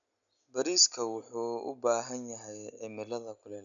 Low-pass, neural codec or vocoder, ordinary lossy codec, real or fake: 7.2 kHz; none; none; real